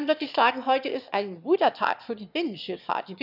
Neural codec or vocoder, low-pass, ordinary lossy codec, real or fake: autoencoder, 22.05 kHz, a latent of 192 numbers a frame, VITS, trained on one speaker; 5.4 kHz; none; fake